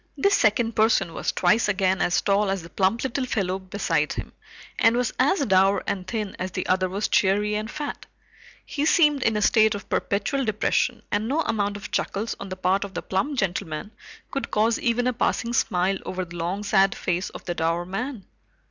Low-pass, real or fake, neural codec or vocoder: 7.2 kHz; real; none